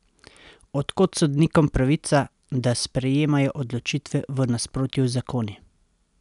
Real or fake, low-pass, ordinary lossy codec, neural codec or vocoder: real; 10.8 kHz; none; none